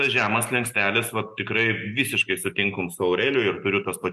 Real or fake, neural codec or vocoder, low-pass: real; none; 14.4 kHz